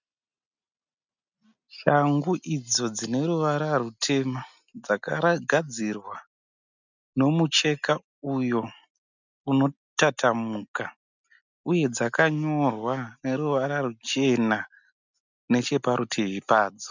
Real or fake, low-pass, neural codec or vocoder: real; 7.2 kHz; none